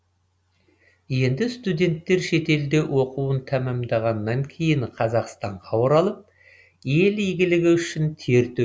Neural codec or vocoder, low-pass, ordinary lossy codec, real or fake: none; none; none; real